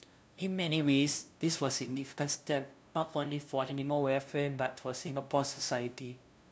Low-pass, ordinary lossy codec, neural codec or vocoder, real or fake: none; none; codec, 16 kHz, 0.5 kbps, FunCodec, trained on LibriTTS, 25 frames a second; fake